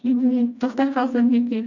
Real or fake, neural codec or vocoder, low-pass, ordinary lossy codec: fake; codec, 16 kHz, 1 kbps, FreqCodec, smaller model; 7.2 kHz; none